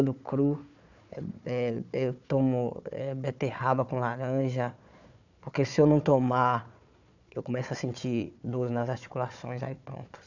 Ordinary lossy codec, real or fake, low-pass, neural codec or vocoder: none; fake; 7.2 kHz; codec, 16 kHz, 4 kbps, FunCodec, trained on Chinese and English, 50 frames a second